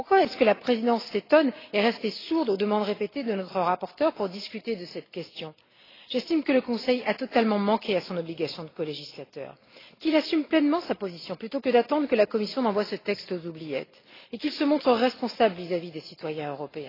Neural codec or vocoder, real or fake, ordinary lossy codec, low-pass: none; real; AAC, 24 kbps; 5.4 kHz